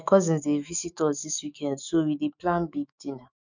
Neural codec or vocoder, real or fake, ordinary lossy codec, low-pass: autoencoder, 48 kHz, 128 numbers a frame, DAC-VAE, trained on Japanese speech; fake; none; 7.2 kHz